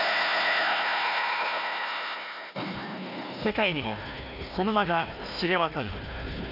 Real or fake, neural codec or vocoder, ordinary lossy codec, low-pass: fake; codec, 16 kHz, 1 kbps, FunCodec, trained on Chinese and English, 50 frames a second; none; 5.4 kHz